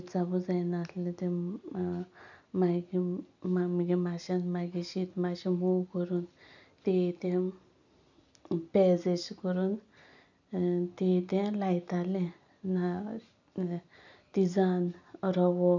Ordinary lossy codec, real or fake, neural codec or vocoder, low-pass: none; real; none; 7.2 kHz